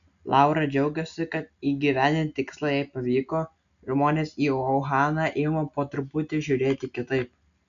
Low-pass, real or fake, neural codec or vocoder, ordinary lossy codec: 7.2 kHz; real; none; AAC, 96 kbps